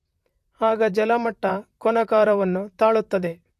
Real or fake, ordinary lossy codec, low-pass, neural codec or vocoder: fake; AAC, 64 kbps; 14.4 kHz; vocoder, 44.1 kHz, 128 mel bands, Pupu-Vocoder